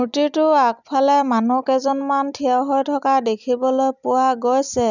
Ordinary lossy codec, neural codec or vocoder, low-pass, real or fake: none; none; 7.2 kHz; real